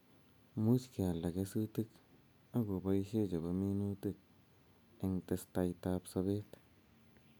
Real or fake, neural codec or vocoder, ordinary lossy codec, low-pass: real; none; none; none